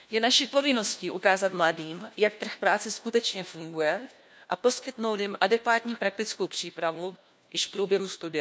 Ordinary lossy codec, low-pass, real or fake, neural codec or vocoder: none; none; fake; codec, 16 kHz, 1 kbps, FunCodec, trained on LibriTTS, 50 frames a second